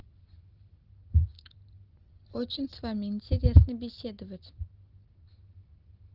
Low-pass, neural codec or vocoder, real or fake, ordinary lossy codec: 5.4 kHz; none; real; Opus, 16 kbps